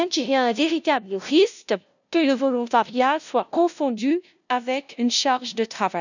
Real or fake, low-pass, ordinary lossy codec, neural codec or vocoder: fake; 7.2 kHz; none; codec, 16 kHz, 0.5 kbps, FunCodec, trained on LibriTTS, 25 frames a second